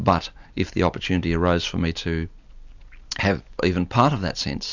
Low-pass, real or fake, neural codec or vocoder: 7.2 kHz; real; none